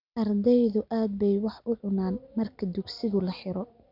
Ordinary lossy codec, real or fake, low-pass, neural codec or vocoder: none; real; 5.4 kHz; none